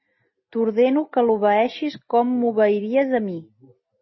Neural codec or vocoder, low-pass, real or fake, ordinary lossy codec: none; 7.2 kHz; real; MP3, 24 kbps